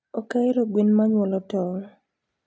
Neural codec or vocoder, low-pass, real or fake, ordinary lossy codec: none; none; real; none